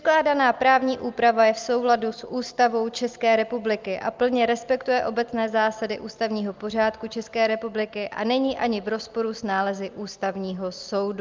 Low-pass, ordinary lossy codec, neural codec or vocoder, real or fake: 7.2 kHz; Opus, 24 kbps; none; real